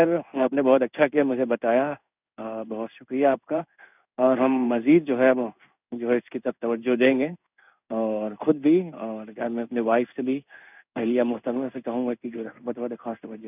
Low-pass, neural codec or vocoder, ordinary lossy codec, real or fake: 3.6 kHz; codec, 16 kHz in and 24 kHz out, 1 kbps, XY-Tokenizer; none; fake